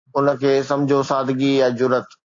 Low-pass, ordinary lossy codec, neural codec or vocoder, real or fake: 7.2 kHz; AAC, 48 kbps; none; real